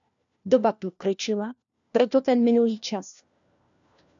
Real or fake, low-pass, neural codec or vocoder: fake; 7.2 kHz; codec, 16 kHz, 1 kbps, FunCodec, trained on LibriTTS, 50 frames a second